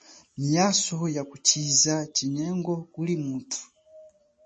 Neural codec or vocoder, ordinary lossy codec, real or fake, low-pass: none; MP3, 32 kbps; real; 10.8 kHz